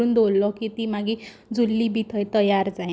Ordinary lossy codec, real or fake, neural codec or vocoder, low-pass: none; real; none; none